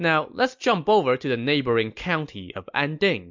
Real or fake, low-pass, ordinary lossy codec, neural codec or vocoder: real; 7.2 kHz; MP3, 64 kbps; none